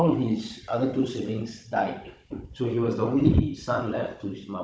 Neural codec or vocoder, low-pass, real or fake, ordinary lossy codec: codec, 16 kHz, 16 kbps, FunCodec, trained on Chinese and English, 50 frames a second; none; fake; none